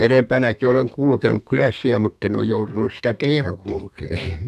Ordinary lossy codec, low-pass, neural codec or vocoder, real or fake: none; 14.4 kHz; codec, 32 kHz, 1.9 kbps, SNAC; fake